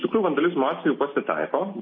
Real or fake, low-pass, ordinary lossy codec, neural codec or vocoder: real; 7.2 kHz; MP3, 24 kbps; none